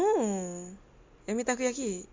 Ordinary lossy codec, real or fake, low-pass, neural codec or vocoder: MP3, 48 kbps; real; 7.2 kHz; none